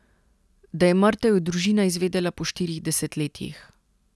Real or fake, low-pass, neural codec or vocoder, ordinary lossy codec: real; none; none; none